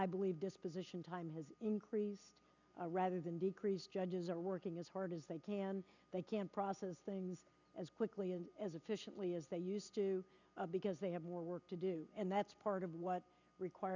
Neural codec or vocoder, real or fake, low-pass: none; real; 7.2 kHz